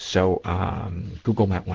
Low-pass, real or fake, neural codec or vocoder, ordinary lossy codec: 7.2 kHz; fake; codec, 16 kHz in and 24 kHz out, 1 kbps, XY-Tokenizer; Opus, 16 kbps